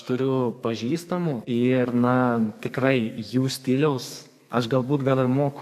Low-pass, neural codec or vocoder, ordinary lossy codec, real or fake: 14.4 kHz; codec, 32 kHz, 1.9 kbps, SNAC; AAC, 96 kbps; fake